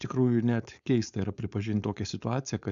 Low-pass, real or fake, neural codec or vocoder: 7.2 kHz; fake; codec, 16 kHz, 4.8 kbps, FACodec